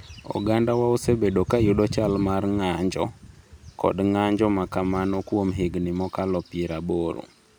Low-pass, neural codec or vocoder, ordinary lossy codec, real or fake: none; none; none; real